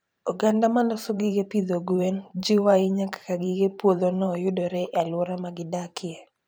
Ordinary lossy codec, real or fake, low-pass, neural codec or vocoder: none; fake; none; vocoder, 44.1 kHz, 128 mel bands every 512 samples, BigVGAN v2